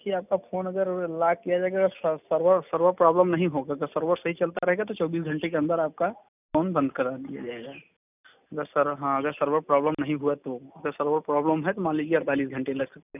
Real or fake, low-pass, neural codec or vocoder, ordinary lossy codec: real; 3.6 kHz; none; none